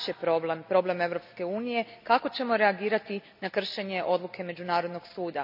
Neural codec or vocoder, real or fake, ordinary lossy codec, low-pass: none; real; none; 5.4 kHz